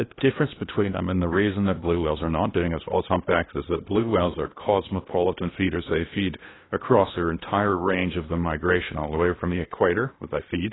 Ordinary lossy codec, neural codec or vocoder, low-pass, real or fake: AAC, 16 kbps; codec, 16 kHz in and 24 kHz out, 0.8 kbps, FocalCodec, streaming, 65536 codes; 7.2 kHz; fake